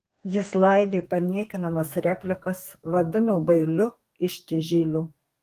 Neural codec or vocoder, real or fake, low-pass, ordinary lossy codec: codec, 32 kHz, 1.9 kbps, SNAC; fake; 14.4 kHz; Opus, 24 kbps